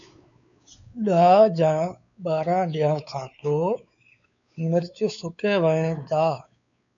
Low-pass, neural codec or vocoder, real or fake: 7.2 kHz; codec, 16 kHz, 4 kbps, X-Codec, WavLM features, trained on Multilingual LibriSpeech; fake